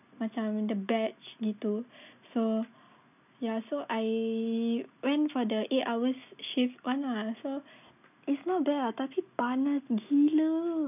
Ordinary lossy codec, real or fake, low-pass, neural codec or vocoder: none; real; 3.6 kHz; none